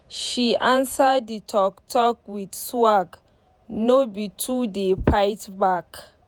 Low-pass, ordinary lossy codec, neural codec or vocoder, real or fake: none; none; vocoder, 48 kHz, 128 mel bands, Vocos; fake